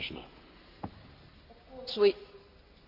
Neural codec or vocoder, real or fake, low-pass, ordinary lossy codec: none; real; 5.4 kHz; AAC, 48 kbps